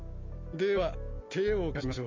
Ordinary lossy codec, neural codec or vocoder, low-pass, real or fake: MP3, 48 kbps; none; 7.2 kHz; real